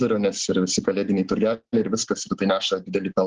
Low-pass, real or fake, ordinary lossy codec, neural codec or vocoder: 7.2 kHz; real; Opus, 16 kbps; none